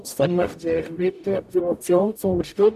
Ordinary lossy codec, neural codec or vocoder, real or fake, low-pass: none; codec, 44.1 kHz, 0.9 kbps, DAC; fake; 14.4 kHz